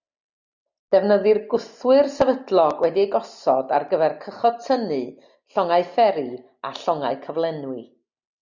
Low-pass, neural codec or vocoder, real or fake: 7.2 kHz; none; real